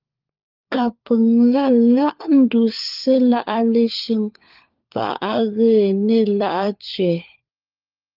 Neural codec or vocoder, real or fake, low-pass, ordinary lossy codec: codec, 16 kHz, 4 kbps, FunCodec, trained on LibriTTS, 50 frames a second; fake; 5.4 kHz; Opus, 24 kbps